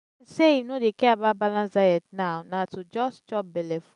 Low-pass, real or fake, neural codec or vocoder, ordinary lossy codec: 10.8 kHz; real; none; none